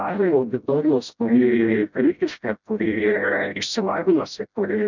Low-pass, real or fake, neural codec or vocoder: 7.2 kHz; fake; codec, 16 kHz, 0.5 kbps, FreqCodec, smaller model